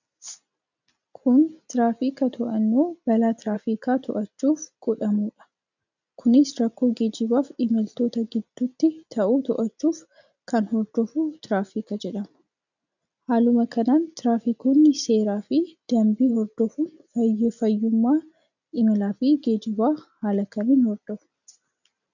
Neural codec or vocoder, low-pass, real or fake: none; 7.2 kHz; real